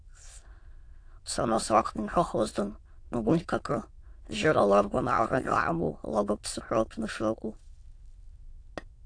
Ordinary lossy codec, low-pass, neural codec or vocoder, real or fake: AAC, 48 kbps; 9.9 kHz; autoencoder, 22.05 kHz, a latent of 192 numbers a frame, VITS, trained on many speakers; fake